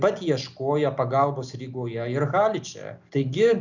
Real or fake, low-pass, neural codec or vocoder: real; 7.2 kHz; none